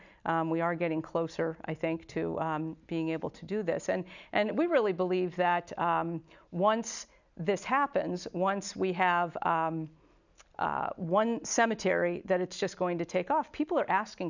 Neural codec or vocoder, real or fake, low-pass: none; real; 7.2 kHz